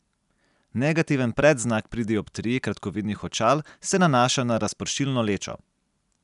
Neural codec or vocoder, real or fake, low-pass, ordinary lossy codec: none; real; 10.8 kHz; none